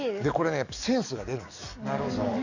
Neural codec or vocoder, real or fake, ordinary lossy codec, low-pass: codec, 44.1 kHz, 7.8 kbps, DAC; fake; none; 7.2 kHz